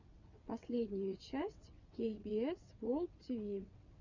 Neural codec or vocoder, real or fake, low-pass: vocoder, 22.05 kHz, 80 mel bands, WaveNeXt; fake; 7.2 kHz